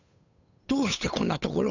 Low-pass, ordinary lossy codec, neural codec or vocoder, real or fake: 7.2 kHz; none; codec, 16 kHz, 8 kbps, FunCodec, trained on Chinese and English, 25 frames a second; fake